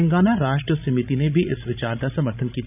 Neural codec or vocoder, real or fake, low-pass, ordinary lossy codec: codec, 16 kHz, 16 kbps, FreqCodec, larger model; fake; 3.6 kHz; none